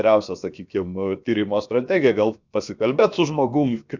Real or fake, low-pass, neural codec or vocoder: fake; 7.2 kHz; codec, 16 kHz, about 1 kbps, DyCAST, with the encoder's durations